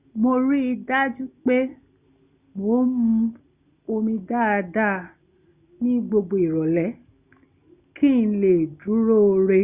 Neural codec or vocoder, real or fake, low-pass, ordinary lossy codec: none; real; 3.6 kHz; Opus, 64 kbps